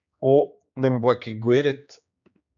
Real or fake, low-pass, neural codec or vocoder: fake; 7.2 kHz; codec, 16 kHz, 2 kbps, X-Codec, HuBERT features, trained on general audio